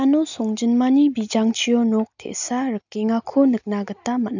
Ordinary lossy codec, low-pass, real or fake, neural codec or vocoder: none; 7.2 kHz; real; none